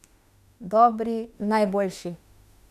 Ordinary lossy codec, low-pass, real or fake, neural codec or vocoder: none; 14.4 kHz; fake; autoencoder, 48 kHz, 32 numbers a frame, DAC-VAE, trained on Japanese speech